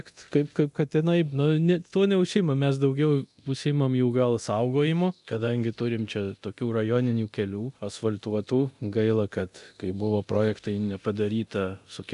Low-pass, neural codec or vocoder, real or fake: 10.8 kHz; codec, 24 kHz, 0.9 kbps, DualCodec; fake